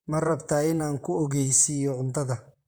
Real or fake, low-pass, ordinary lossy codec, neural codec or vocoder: fake; none; none; vocoder, 44.1 kHz, 128 mel bands, Pupu-Vocoder